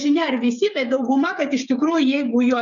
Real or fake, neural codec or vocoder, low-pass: fake; codec, 16 kHz, 8 kbps, FreqCodec, smaller model; 7.2 kHz